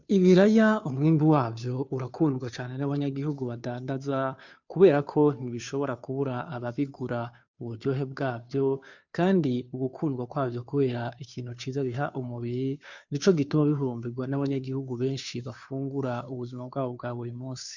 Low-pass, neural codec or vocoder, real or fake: 7.2 kHz; codec, 16 kHz, 2 kbps, FunCodec, trained on Chinese and English, 25 frames a second; fake